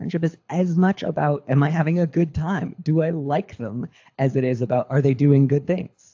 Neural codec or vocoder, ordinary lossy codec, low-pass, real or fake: codec, 24 kHz, 6 kbps, HILCodec; AAC, 48 kbps; 7.2 kHz; fake